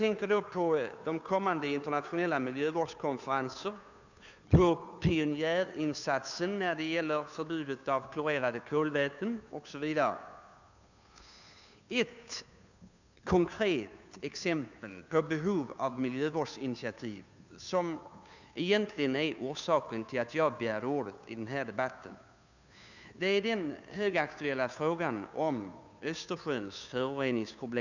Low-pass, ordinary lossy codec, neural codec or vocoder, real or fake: 7.2 kHz; none; codec, 16 kHz, 2 kbps, FunCodec, trained on Chinese and English, 25 frames a second; fake